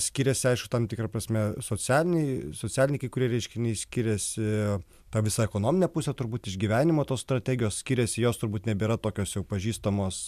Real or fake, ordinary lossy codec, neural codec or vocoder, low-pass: real; MP3, 96 kbps; none; 14.4 kHz